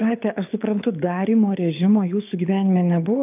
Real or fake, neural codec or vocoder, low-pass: fake; codec, 24 kHz, 6 kbps, HILCodec; 3.6 kHz